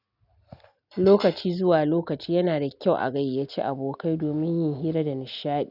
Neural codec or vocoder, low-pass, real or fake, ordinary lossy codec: none; 5.4 kHz; real; none